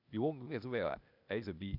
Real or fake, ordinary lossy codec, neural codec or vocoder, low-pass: fake; none; codec, 16 kHz, 0.8 kbps, ZipCodec; 5.4 kHz